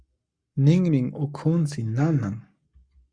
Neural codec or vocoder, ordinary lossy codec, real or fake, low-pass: codec, 44.1 kHz, 7.8 kbps, Pupu-Codec; Opus, 64 kbps; fake; 9.9 kHz